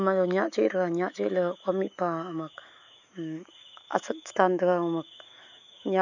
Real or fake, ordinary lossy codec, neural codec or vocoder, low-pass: fake; none; autoencoder, 48 kHz, 128 numbers a frame, DAC-VAE, trained on Japanese speech; 7.2 kHz